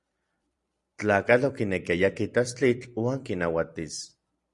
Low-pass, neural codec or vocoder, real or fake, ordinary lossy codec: 10.8 kHz; vocoder, 44.1 kHz, 128 mel bands every 256 samples, BigVGAN v2; fake; Opus, 64 kbps